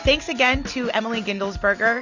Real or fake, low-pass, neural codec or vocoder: real; 7.2 kHz; none